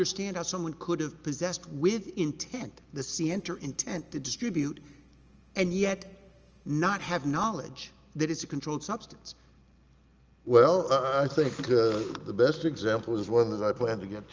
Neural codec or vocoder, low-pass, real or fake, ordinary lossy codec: none; 7.2 kHz; real; Opus, 24 kbps